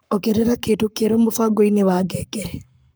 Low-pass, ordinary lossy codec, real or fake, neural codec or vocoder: none; none; fake; codec, 44.1 kHz, 7.8 kbps, Pupu-Codec